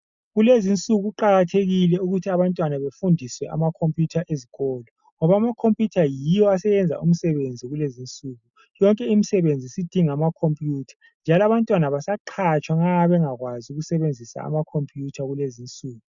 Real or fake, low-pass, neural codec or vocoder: real; 7.2 kHz; none